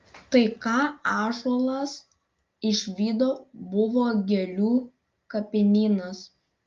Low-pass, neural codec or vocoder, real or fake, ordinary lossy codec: 7.2 kHz; none; real; Opus, 32 kbps